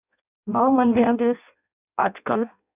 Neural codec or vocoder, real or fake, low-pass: codec, 16 kHz in and 24 kHz out, 0.6 kbps, FireRedTTS-2 codec; fake; 3.6 kHz